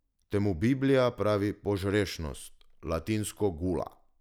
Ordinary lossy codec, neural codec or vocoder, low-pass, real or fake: none; none; 19.8 kHz; real